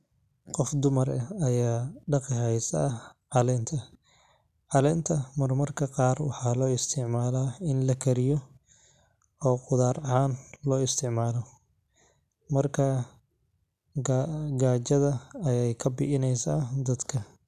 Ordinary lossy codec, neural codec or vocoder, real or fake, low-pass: none; none; real; 14.4 kHz